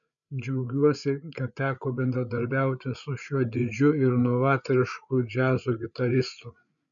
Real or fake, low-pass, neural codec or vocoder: fake; 7.2 kHz; codec, 16 kHz, 8 kbps, FreqCodec, larger model